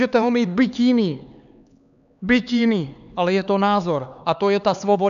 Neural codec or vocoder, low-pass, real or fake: codec, 16 kHz, 4 kbps, X-Codec, HuBERT features, trained on LibriSpeech; 7.2 kHz; fake